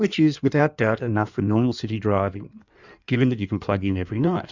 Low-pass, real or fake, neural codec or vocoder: 7.2 kHz; fake; codec, 16 kHz in and 24 kHz out, 1.1 kbps, FireRedTTS-2 codec